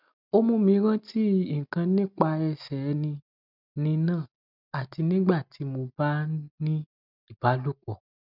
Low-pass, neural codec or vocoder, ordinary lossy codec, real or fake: 5.4 kHz; none; none; real